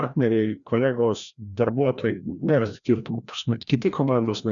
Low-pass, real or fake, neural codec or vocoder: 7.2 kHz; fake; codec, 16 kHz, 1 kbps, FreqCodec, larger model